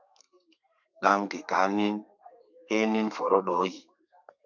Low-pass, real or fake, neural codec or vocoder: 7.2 kHz; fake; codec, 32 kHz, 1.9 kbps, SNAC